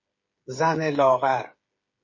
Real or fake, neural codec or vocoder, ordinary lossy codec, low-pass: fake; codec, 16 kHz, 8 kbps, FreqCodec, smaller model; MP3, 32 kbps; 7.2 kHz